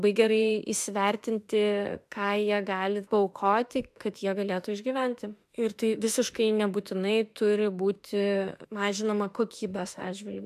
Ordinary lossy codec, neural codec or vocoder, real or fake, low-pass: AAC, 96 kbps; autoencoder, 48 kHz, 32 numbers a frame, DAC-VAE, trained on Japanese speech; fake; 14.4 kHz